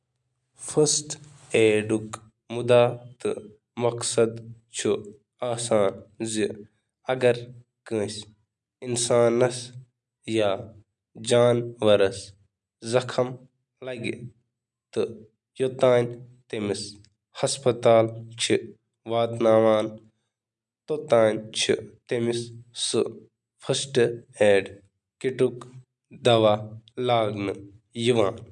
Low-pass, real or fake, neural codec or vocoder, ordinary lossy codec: 10.8 kHz; real; none; none